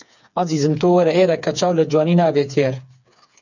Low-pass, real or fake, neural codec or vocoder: 7.2 kHz; fake; codec, 16 kHz, 4 kbps, FreqCodec, smaller model